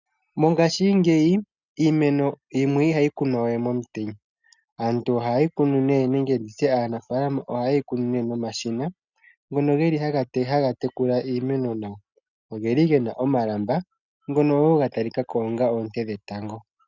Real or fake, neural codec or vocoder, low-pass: real; none; 7.2 kHz